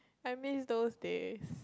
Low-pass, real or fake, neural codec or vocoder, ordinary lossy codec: none; real; none; none